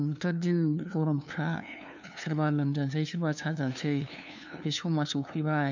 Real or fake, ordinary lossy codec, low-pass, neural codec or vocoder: fake; none; 7.2 kHz; codec, 16 kHz, 2 kbps, FunCodec, trained on LibriTTS, 25 frames a second